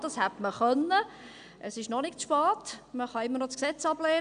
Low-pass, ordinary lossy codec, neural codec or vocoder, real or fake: 9.9 kHz; none; none; real